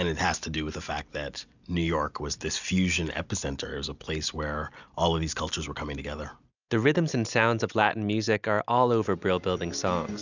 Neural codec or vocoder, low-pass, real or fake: none; 7.2 kHz; real